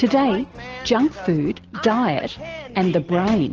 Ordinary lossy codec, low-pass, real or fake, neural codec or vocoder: Opus, 16 kbps; 7.2 kHz; real; none